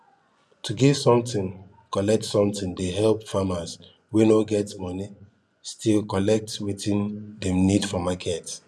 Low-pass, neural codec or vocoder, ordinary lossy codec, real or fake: none; vocoder, 24 kHz, 100 mel bands, Vocos; none; fake